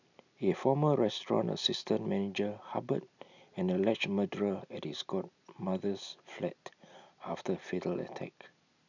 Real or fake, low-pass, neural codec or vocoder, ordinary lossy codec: real; 7.2 kHz; none; none